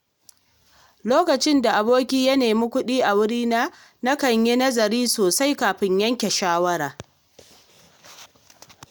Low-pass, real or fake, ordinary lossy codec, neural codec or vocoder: none; real; none; none